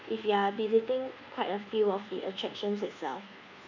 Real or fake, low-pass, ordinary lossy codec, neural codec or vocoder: fake; 7.2 kHz; none; codec, 24 kHz, 1.2 kbps, DualCodec